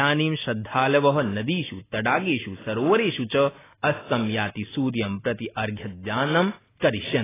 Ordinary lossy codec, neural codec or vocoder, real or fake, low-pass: AAC, 16 kbps; none; real; 3.6 kHz